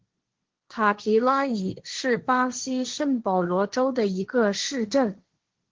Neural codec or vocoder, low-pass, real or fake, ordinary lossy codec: codec, 16 kHz, 1.1 kbps, Voila-Tokenizer; 7.2 kHz; fake; Opus, 16 kbps